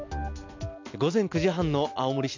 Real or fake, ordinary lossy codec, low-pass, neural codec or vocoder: real; MP3, 64 kbps; 7.2 kHz; none